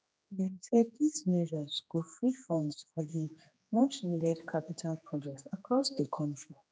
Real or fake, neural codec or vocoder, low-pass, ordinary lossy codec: fake; codec, 16 kHz, 2 kbps, X-Codec, HuBERT features, trained on general audio; none; none